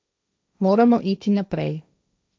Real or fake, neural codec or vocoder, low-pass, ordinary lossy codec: fake; codec, 16 kHz, 1.1 kbps, Voila-Tokenizer; none; none